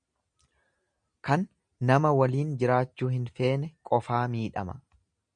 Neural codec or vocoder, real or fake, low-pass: none; real; 9.9 kHz